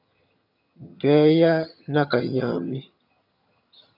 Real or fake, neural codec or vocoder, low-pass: fake; vocoder, 22.05 kHz, 80 mel bands, HiFi-GAN; 5.4 kHz